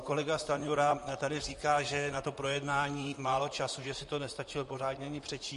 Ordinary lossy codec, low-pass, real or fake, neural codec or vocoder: MP3, 48 kbps; 14.4 kHz; fake; vocoder, 44.1 kHz, 128 mel bands, Pupu-Vocoder